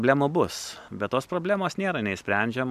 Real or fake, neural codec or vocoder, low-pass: real; none; 14.4 kHz